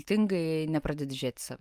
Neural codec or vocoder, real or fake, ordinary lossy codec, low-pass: vocoder, 44.1 kHz, 128 mel bands every 512 samples, BigVGAN v2; fake; Opus, 32 kbps; 14.4 kHz